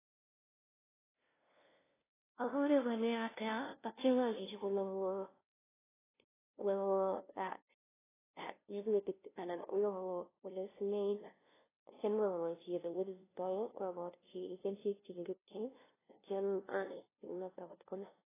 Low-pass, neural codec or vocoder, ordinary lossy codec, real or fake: 3.6 kHz; codec, 16 kHz, 0.5 kbps, FunCodec, trained on LibriTTS, 25 frames a second; AAC, 16 kbps; fake